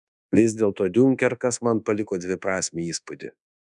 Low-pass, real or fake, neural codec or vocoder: 10.8 kHz; fake; codec, 24 kHz, 1.2 kbps, DualCodec